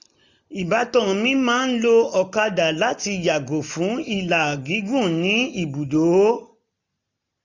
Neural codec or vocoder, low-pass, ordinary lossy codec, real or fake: none; 7.2 kHz; none; real